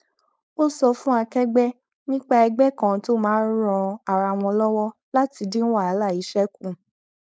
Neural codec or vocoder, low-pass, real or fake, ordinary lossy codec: codec, 16 kHz, 4.8 kbps, FACodec; none; fake; none